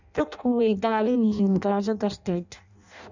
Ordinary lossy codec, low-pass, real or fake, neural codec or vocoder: none; 7.2 kHz; fake; codec, 16 kHz in and 24 kHz out, 0.6 kbps, FireRedTTS-2 codec